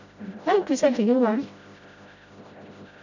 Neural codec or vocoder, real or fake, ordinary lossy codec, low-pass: codec, 16 kHz, 0.5 kbps, FreqCodec, smaller model; fake; none; 7.2 kHz